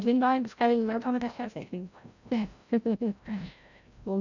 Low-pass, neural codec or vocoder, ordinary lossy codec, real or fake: 7.2 kHz; codec, 16 kHz, 0.5 kbps, FreqCodec, larger model; none; fake